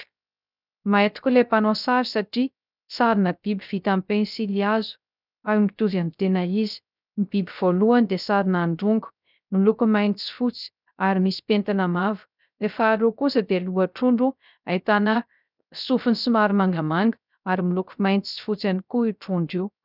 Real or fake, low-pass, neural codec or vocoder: fake; 5.4 kHz; codec, 16 kHz, 0.3 kbps, FocalCodec